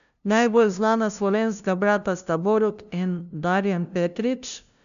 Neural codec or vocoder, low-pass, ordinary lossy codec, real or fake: codec, 16 kHz, 0.5 kbps, FunCodec, trained on LibriTTS, 25 frames a second; 7.2 kHz; none; fake